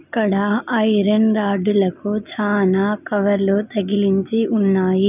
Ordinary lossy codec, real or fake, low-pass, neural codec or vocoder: none; real; 3.6 kHz; none